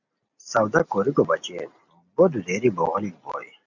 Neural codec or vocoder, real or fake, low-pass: none; real; 7.2 kHz